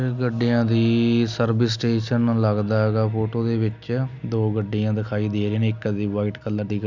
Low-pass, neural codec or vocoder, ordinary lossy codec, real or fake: 7.2 kHz; none; none; real